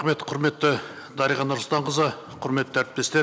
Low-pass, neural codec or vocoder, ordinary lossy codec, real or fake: none; none; none; real